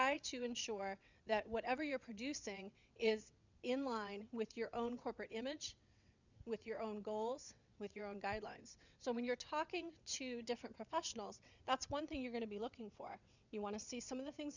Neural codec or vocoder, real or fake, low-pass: vocoder, 22.05 kHz, 80 mel bands, WaveNeXt; fake; 7.2 kHz